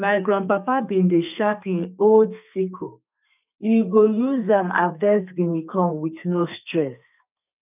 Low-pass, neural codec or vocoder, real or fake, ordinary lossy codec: 3.6 kHz; codec, 32 kHz, 1.9 kbps, SNAC; fake; none